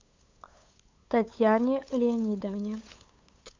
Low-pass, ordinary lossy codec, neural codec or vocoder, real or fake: 7.2 kHz; MP3, 64 kbps; codec, 16 kHz, 8 kbps, FunCodec, trained on LibriTTS, 25 frames a second; fake